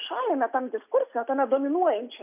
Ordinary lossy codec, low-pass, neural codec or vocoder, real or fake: AAC, 32 kbps; 3.6 kHz; none; real